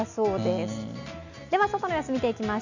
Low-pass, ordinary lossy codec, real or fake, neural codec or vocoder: 7.2 kHz; none; real; none